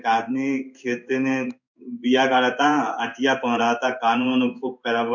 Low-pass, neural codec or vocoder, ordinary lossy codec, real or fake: 7.2 kHz; codec, 16 kHz in and 24 kHz out, 1 kbps, XY-Tokenizer; none; fake